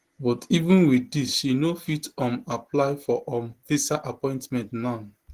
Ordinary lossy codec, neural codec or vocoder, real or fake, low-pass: Opus, 16 kbps; none; real; 14.4 kHz